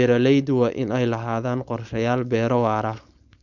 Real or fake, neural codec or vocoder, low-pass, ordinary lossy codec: fake; codec, 16 kHz, 4.8 kbps, FACodec; 7.2 kHz; none